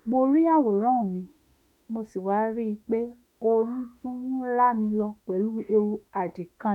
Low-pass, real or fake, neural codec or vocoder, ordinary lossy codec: 19.8 kHz; fake; autoencoder, 48 kHz, 32 numbers a frame, DAC-VAE, trained on Japanese speech; none